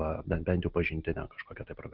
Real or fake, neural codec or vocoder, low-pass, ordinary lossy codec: real; none; 5.4 kHz; Opus, 32 kbps